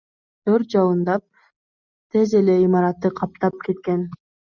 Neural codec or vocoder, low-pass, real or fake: none; 7.2 kHz; real